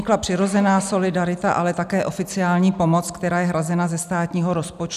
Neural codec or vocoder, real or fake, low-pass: none; real; 14.4 kHz